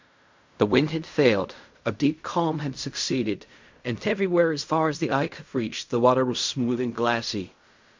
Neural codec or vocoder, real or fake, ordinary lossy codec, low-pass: codec, 16 kHz in and 24 kHz out, 0.4 kbps, LongCat-Audio-Codec, fine tuned four codebook decoder; fake; MP3, 64 kbps; 7.2 kHz